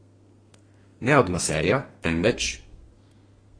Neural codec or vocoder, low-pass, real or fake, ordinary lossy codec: codec, 32 kHz, 1.9 kbps, SNAC; 9.9 kHz; fake; AAC, 32 kbps